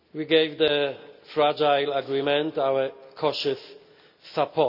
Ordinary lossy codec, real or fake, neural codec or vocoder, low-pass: none; real; none; 5.4 kHz